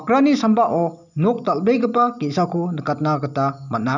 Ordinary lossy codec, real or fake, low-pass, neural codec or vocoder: none; real; 7.2 kHz; none